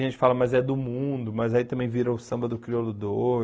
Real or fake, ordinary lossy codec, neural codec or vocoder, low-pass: real; none; none; none